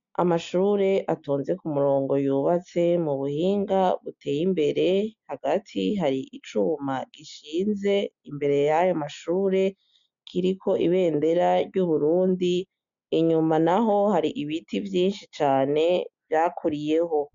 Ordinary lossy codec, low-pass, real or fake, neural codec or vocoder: MP3, 64 kbps; 7.2 kHz; real; none